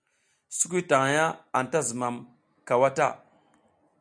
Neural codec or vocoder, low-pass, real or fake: none; 9.9 kHz; real